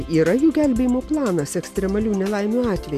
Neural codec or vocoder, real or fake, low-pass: none; real; 14.4 kHz